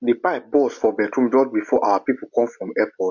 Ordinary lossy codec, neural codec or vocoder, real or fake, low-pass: none; none; real; 7.2 kHz